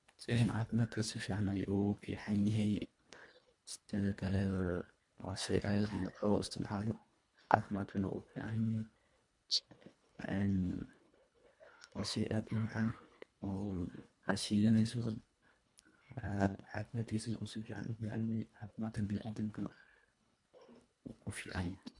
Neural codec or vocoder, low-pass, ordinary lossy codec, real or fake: codec, 24 kHz, 1.5 kbps, HILCodec; 10.8 kHz; MP3, 64 kbps; fake